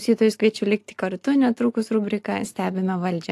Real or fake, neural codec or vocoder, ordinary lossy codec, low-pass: real; none; AAC, 64 kbps; 14.4 kHz